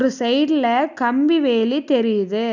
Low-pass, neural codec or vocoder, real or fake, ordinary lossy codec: 7.2 kHz; none; real; none